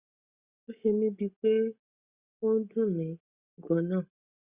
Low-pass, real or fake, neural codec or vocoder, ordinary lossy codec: 3.6 kHz; real; none; Opus, 64 kbps